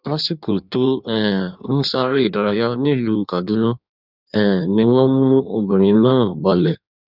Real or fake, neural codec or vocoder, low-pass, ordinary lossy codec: fake; codec, 16 kHz in and 24 kHz out, 1.1 kbps, FireRedTTS-2 codec; 5.4 kHz; none